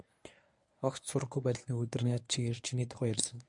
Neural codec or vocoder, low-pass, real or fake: codec, 24 kHz, 0.9 kbps, WavTokenizer, medium speech release version 1; 10.8 kHz; fake